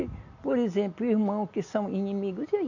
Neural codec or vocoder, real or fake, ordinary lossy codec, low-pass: none; real; none; 7.2 kHz